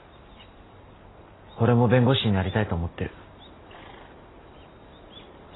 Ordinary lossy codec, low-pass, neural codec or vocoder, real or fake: AAC, 16 kbps; 7.2 kHz; none; real